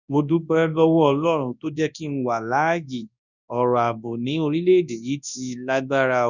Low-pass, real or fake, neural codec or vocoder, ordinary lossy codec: 7.2 kHz; fake; codec, 24 kHz, 0.9 kbps, WavTokenizer, large speech release; none